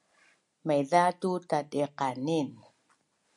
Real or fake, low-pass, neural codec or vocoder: real; 10.8 kHz; none